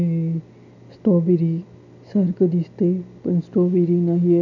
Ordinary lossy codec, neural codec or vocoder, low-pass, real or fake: none; none; 7.2 kHz; real